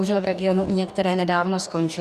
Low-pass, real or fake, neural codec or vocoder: 14.4 kHz; fake; codec, 44.1 kHz, 2.6 kbps, DAC